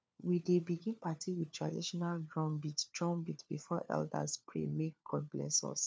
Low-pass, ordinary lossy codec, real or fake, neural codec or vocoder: none; none; fake; codec, 16 kHz, 4 kbps, FunCodec, trained on LibriTTS, 50 frames a second